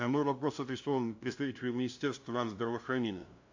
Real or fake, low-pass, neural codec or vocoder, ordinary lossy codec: fake; 7.2 kHz; codec, 16 kHz, 0.5 kbps, FunCodec, trained on LibriTTS, 25 frames a second; AAC, 48 kbps